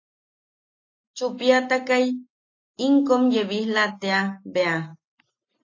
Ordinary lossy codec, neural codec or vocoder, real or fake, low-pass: AAC, 32 kbps; none; real; 7.2 kHz